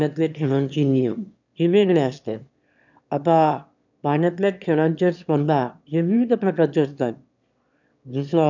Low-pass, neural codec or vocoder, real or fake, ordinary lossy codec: 7.2 kHz; autoencoder, 22.05 kHz, a latent of 192 numbers a frame, VITS, trained on one speaker; fake; none